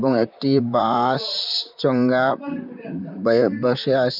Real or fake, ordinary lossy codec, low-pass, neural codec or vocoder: fake; none; 5.4 kHz; vocoder, 44.1 kHz, 128 mel bands, Pupu-Vocoder